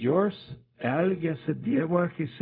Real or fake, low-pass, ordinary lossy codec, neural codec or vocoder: fake; 5.4 kHz; MP3, 48 kbps; codec, 16 kHz, 0.4 kbps, LongCat-Audio-Codec